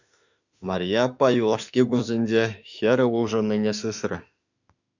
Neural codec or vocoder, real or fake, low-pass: autoencoder, 48 kHz, 32 numbers a frame, DAC-VAE, trained on Japanese speech; fake; 7.2 kHz